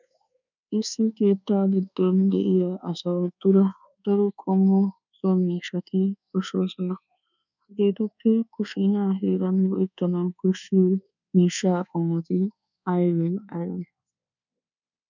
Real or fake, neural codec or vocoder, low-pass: fake; codec, 24 kHz, 1.2 kbps, DualCodec; 7.2 kHz